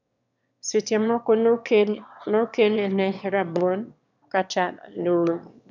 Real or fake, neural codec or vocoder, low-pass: fake; autoencoder, 22.05 kHz, a latent of 192 numbers a frame, VITS, trained on one speaker; 7.2 kHz